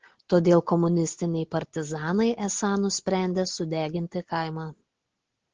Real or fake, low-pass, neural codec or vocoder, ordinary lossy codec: real; 7.2 kHz; none; Opus, 16 kbps